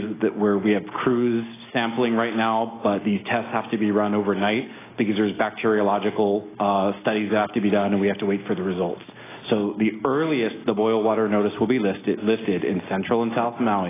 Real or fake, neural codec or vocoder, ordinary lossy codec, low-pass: real; none; AAC, 16 kbps; 3.6 kHz